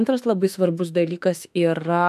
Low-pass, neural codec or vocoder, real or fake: 14.4 kHz; autoencoder, 48 kHz, 32 numbers a frame, DAC-VAE, trained on Japanese speech; fake